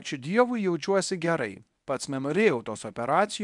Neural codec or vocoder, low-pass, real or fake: codec, 24 kHz, 0.9 kbps, WavTokenizer, medium speech release version 1; 10.8 kHz; fake